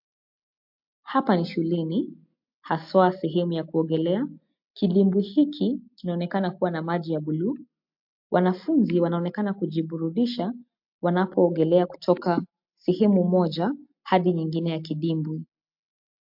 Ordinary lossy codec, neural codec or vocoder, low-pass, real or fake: MP3, 48 kbps; none; 5.4 kHz; real